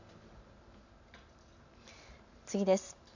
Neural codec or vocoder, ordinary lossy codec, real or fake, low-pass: none; none; real; 7.2 kHz